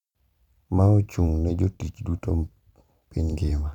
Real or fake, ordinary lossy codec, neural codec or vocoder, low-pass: fake; none; vocoder, 44.1 kHz, 128 mel bands every 256 samples, BigVGAN v2; 19.8 kHz